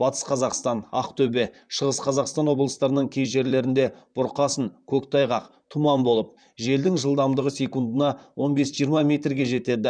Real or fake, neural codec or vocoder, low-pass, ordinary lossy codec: fake; vocoder, 44.1 kHz, 128 mel bands, Pupu-Vocoder; 9.9 kHz; none